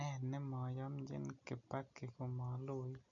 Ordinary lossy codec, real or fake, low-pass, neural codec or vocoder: none; real; 7.2 kHz; none